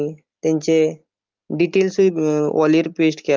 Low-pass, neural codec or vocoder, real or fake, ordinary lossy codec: 7.2 kHz; none; real; Opus, 32 kbps